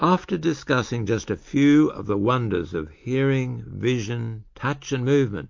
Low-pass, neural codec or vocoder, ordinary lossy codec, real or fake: 7.2 kHz; none; MP3, 48 kbps; real